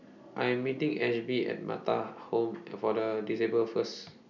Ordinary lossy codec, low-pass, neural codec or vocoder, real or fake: none; 7.2 kHz; none; real